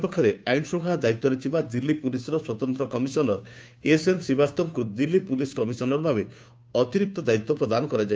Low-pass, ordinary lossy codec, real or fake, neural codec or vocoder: none; none; fake; codec, 16 kHz, 2 kbps, FunCodec, trained on Chinese and English, 25 frames a second